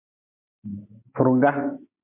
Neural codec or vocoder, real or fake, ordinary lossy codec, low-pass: none; real; MP3, 32 kbps; 3.6 kHz